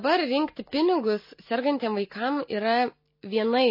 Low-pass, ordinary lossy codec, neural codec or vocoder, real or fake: 5.4 kHz; MP3, 24 kbps; none; real